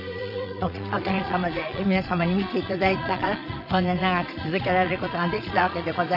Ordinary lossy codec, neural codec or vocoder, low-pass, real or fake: none; vocoder, 22.05 kHz, 80 mel bands, Vocos; 5.4 kHz; fake